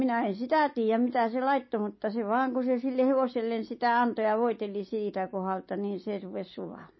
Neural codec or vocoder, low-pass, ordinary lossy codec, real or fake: none; 7.2 kHz; MP3, 24 kbps; real